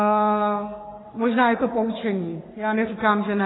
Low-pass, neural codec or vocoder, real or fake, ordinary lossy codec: 7.2 kHz; codec, 44.1 kHz, 3.4 kbps, Pupu-Codec; fake; AAC, 16 kbps